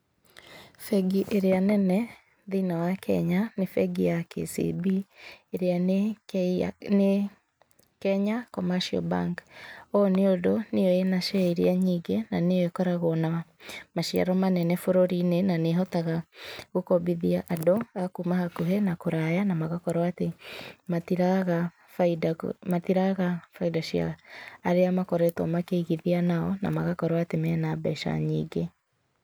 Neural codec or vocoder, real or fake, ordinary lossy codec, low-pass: none; real; none; none